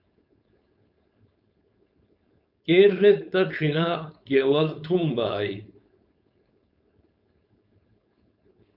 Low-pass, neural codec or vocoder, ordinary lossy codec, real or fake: 5.4 kHz; codec, 16 kHz, 4.8 kbps, FACodec; AAC, 48 kbps; fake